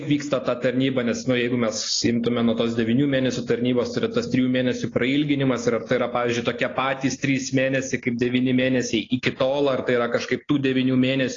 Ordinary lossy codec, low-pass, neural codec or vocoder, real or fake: AAC, 32 kbps; 7.2 kHz; none; real